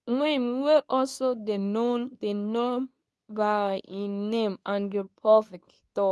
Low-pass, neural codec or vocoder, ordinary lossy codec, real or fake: none; codec, 24 kHz, 0.9 kbps, WavTokenizer, medium speech release version 2; none; fake